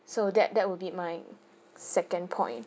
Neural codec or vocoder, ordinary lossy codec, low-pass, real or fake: none; none; none; real